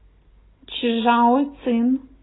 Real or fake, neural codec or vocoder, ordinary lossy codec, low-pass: real; none; AAC, 16 kbps; 7.2 kHz